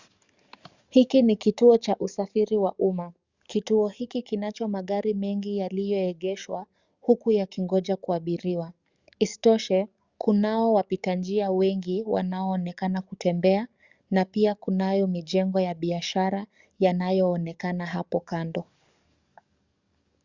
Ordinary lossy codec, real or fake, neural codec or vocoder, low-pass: Opus, 64 kbps; fake; codec, 44.1 kHz, 7.8 kbps, DAC; 7.2 kHz